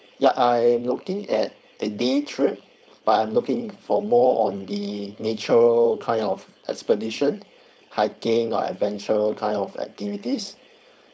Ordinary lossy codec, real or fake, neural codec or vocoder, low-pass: none; fake; codec, 16 kHz, 4.8 kbps, FACodec; none